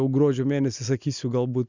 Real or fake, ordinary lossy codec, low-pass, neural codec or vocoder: real; Opus, 64 kbps; 7.2 kHz; none